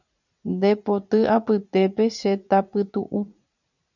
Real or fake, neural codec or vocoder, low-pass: fake; vocoder, 44.1 kHz, 80 mel bands, Vocos; 7.2 kHz